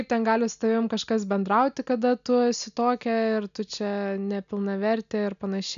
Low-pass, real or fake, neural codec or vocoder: 7.2 kHz; real; none